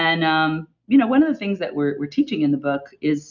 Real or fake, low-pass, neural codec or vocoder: real; 7.2 kHz; none